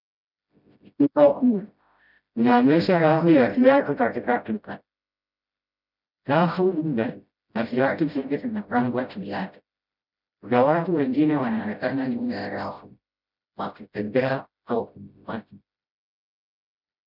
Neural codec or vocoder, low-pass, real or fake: codec, 16 kHz, 0.5 kbps, FreqCodec, smaller model; 5.4 kHz; fake